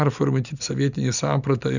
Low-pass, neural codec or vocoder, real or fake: 7.2 kHz; none; real